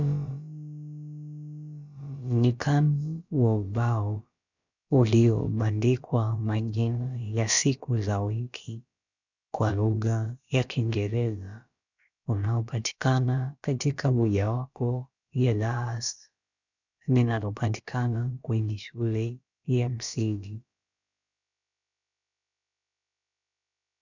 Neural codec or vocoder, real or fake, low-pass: codec, 16 kHz, about 1 kbps, DyCAST, with the encoder's durations; fake; 7.2 kHz